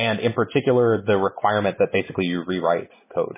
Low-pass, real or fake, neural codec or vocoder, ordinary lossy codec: 3.6 kHz; real; none; MP3, 16 kbps